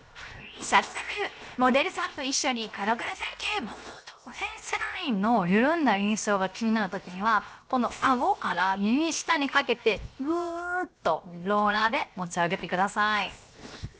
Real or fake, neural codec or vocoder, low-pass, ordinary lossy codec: fake; codec, 16 kHz, 0.7 kbps, FocalCodec; none; none